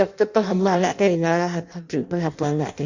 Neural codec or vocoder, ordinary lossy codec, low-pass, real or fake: codec, 16 kHz in and 24 kHz out, 0.6 kbps, FireRedTTS-2 codec; Opus, 64 kbps; 7.2 kHz; fake